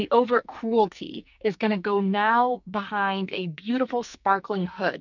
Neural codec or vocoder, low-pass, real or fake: codec, 44.1 kHz, 2.6 kbps, SNAC; 7.2 kHz; fake